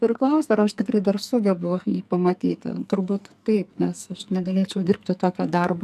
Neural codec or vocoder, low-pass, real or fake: codec, 44.1 kHz, 2.6 kbps, SNAC; 14.4 kHz; fake